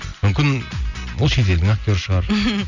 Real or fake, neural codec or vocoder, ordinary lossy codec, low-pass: real; none; none; 7.2 kHz